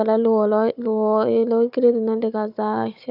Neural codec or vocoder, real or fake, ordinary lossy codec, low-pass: codec, 16 kHz, 4 kbps, FunCodec, trained on Chinese and English, 50 frames a second; fake; none; 5.4 kHz